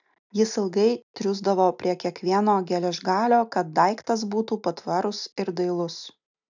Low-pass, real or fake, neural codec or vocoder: 7.2 kHz; real; none